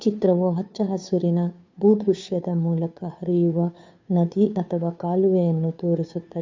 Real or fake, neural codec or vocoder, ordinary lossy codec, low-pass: fake; codec, 16 kHz, 2 kbps, FunCodec, trained on Chinese and English, 25 frames a second; MP3, 64 kbps; 7.2 kHz